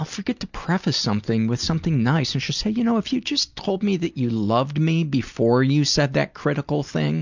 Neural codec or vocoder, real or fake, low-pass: none; real; 7.2 kHz